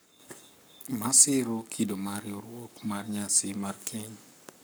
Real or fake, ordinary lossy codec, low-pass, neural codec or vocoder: fake; none; none; codec, 44.1 kHz, 7.8 kbps, Pupu-Codec